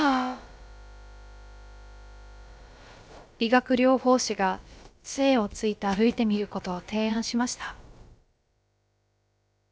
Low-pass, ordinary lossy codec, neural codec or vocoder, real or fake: none; none; codec, 16 kHz, about 1 kbps, DyCAST, with the encoder's durations; fake